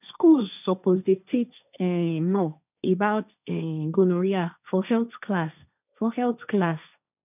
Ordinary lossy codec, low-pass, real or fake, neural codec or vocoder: none; 3.6 kHz; fake; codec, 16 kHz, 1.1 kbps, Voila-Tokenizer